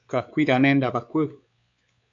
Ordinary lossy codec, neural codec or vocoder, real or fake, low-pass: MP3, 64 kbps; codec, 16 kHz, 4 kbps, X-Codec, WavLM features, trained on Multilingual LibriSpeech; fake; 7.2 kHz